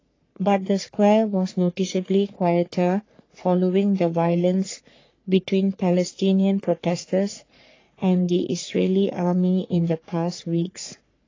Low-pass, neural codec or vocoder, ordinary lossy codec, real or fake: 7.2 kHz; codec, 44.1 kHz, 3.4 kbps, Pupu-Codec; AAC, 32 kbps; fake